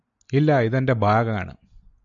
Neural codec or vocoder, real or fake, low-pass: none; real; 7.2 kHz